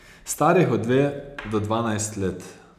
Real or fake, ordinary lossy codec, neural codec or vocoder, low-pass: real; none; none; 14.4 kHz